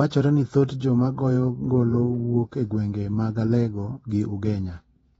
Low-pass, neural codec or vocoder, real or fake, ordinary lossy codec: 19.8 kHz; vocoder, 48 kHz, 128 mel bands, Vocos; fake; AAC, 24 kbps